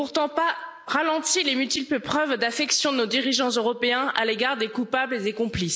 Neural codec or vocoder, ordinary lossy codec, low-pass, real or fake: none; none; none; real